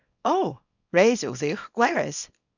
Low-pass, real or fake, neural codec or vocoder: 7.2 kHz; fake; codec, 24 kHz, 0.9 kbps, WavTokenizer, small release